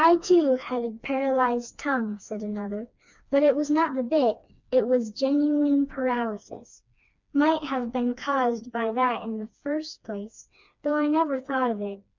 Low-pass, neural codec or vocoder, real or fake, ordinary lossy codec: 7.2 kHz; codec, 16 kHz, 2 kbps, FreqCodec, smaller model; fake; MP3, 64 kbps